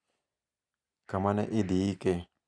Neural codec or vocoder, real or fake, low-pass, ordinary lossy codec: none; real; 9.9 kHz; none